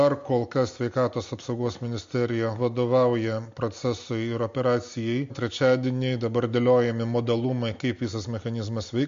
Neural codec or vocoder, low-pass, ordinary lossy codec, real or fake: none; 7.2 kHz; MP3, 48 kbps; real